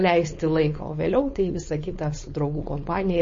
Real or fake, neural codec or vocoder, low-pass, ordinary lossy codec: fake; codec, 16 kHz, 4.8 kbps, FACodec; 7.2 kHz; MP3, 32 kbps